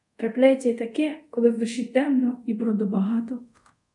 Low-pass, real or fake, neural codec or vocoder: 10.8 kHz; fake; codec, 24 kHz, 0.5 kbps, DualCodec